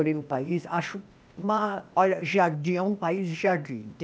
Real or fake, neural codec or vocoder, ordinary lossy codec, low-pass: fake; codec, 16 kHz, 0.8 kbps, ZipCodec; none; none